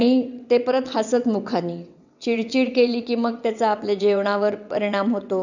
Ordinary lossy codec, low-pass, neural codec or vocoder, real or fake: none; 7.2 kHz; vocoder, 22.05 kHz, 80 mel bands, WaveNeXt; fake